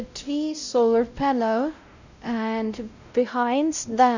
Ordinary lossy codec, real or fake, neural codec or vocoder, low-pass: none; fake; codec, 16 kHz, 0.5 kbps, X-Codec, WavLM features, trained on Multilingual LibriSpeech; 7.2 kHz